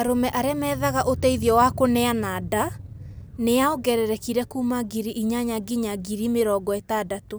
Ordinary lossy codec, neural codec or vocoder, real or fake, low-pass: none; none; real; none